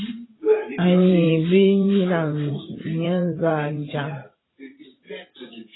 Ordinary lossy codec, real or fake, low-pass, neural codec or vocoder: AAC, 16 kbps; fake; 7.2 kHz; vocoder, 44.1 kHz, 128 mel bands every 512 samples, BigVGAN v2